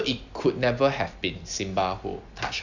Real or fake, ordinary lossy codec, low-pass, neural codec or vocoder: real; none; 7.2 kHz; none